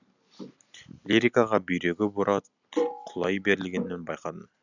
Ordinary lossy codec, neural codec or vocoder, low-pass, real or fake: none; none; 7.2 kHz; real